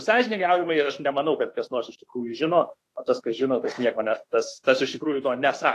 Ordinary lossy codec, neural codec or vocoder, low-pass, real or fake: AAC, 48 kbps; autoencoder, 48 kHz, 32 numbers a frame, DAC-VAE, trained on Japanese speech; 14.4 kHz; fake